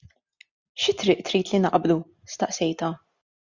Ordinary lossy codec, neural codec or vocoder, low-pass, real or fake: Opus, 64 kbps; none; 7.2 kHz; real